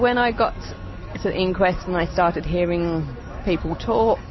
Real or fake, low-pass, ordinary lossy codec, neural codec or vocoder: real; 7.2 kHz; MP3, 24 kbps; none